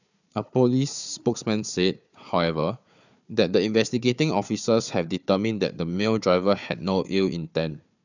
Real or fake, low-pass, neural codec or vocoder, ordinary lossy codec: fake; 7.2 kHz; codec, 16 kHz, 4 kbps, FunCodec, trained on Chinese and English, 50 frames a second; none